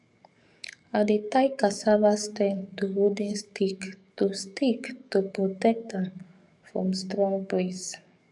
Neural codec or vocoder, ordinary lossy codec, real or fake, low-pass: codec, 44.1 kHz, 7.8 kbps, DAC; none; fake; 10.8 kHz